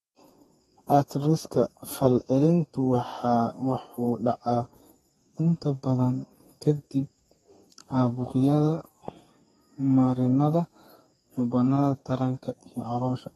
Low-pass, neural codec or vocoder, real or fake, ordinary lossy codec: 14.4 kHz; codec, 32 kHz, 1.9 kbps, SNAC; fake; AAC, 32 kbps